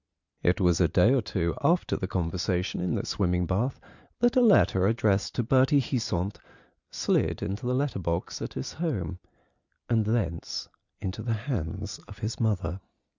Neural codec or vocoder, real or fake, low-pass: none; real; 7.2 kHz